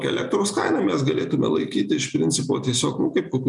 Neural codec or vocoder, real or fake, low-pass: none; real; 10.8 kHz